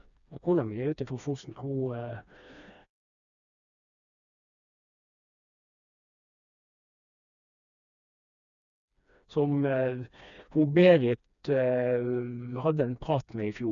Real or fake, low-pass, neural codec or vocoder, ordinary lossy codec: fake; 7.2 kHz; codec, 16 kHz, 2 kbps, FreqCodec, smaller model; none